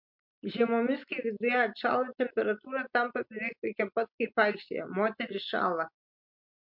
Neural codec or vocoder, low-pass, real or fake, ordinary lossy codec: none; 5.4 kHz; real; MP3, 48 kbps